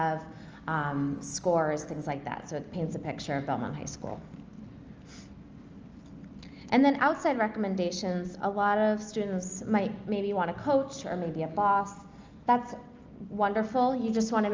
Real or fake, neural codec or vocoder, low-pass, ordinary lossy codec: real; none; 7.2 kHz; Opus, 24 kbps